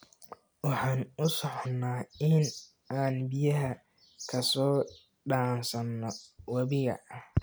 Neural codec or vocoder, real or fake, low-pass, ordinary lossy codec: none; real; none; none